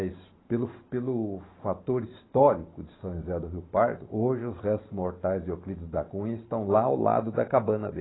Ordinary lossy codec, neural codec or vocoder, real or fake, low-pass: AAC, 16 kbps; vocoder, 44.1 kHz, 128 mel bands every 512 samples, BigVGAN v2; fake; 7.2 kHz